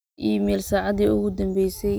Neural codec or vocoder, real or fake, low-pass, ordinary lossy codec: none; real; none; none